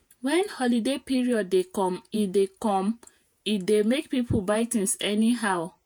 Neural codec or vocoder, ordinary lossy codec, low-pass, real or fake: vocoder, 48 kHz, 128 mel bands, Vocos; none; none; fake